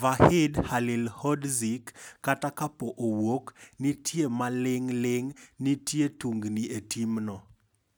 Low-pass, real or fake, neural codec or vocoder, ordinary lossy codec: none; real; none; none